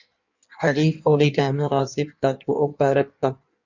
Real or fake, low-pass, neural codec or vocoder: fake; 7.2 kHz; codec, 16 kHz in and 24 kHz out, 1.1 kbps, FireRedTTS-2 codec